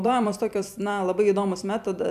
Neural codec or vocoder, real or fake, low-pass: vocoder, 44.1 kHz, 128 mel bands every 256 samples, BigVGAN v2; fake; 14.4 kHz